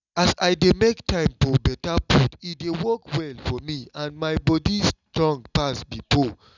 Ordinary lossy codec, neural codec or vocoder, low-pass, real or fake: none; none; 7.2 kHz; real